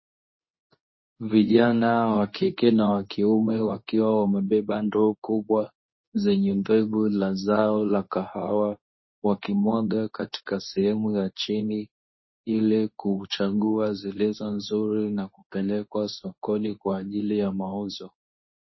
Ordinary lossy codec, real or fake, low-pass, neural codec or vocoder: MP3, 24 kbps; fake; 7.2 kHz; codec, 24 kHz, 0.9 kbps, WavTokenizer, medium speech release version 2